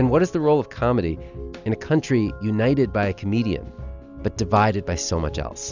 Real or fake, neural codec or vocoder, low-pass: real; none; 7.2 kHz